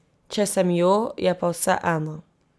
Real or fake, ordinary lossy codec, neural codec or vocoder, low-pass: real; none; none; none